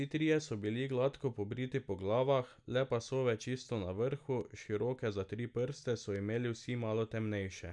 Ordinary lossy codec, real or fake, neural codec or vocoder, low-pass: none; real; none; 10.8 kHz